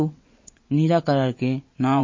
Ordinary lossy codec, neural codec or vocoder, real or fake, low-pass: MP3, 32 kbps; vocoder, 22.05 kHz, 80 mel bands, WaveNeXt; fake; 7.2 kHz